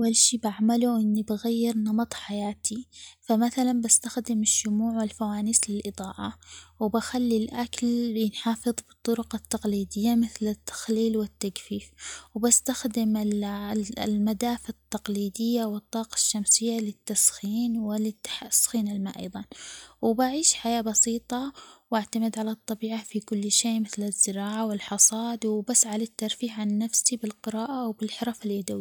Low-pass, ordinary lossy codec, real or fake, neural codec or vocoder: none; none; real; none